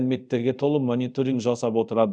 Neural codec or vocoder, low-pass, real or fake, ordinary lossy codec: codec, 24 kHz, 0.5 kbps, DualCodec; 9.9 kHz; fake; none